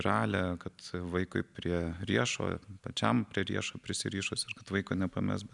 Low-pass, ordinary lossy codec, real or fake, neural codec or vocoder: 10.8 kHz; MP3, 96 kbps; real; none